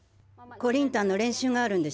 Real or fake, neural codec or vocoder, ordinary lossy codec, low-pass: real; none; none; none